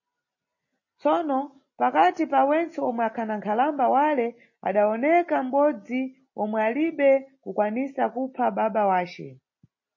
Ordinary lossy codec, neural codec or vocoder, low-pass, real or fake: MP3, 32 kbps; none; 7.2 kHz; real